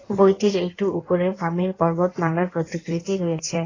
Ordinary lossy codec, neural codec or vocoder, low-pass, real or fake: AAC, 32 kbps; codec, 16 kHz in and 24 kHz out, 1.1 kbps, FireRedTTS-2 codec; 7.2 kHz; fake